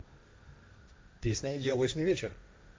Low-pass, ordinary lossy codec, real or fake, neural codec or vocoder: none; none; fake; codec, 16 kHz, 1.1 kbps, Voila-Tokenizer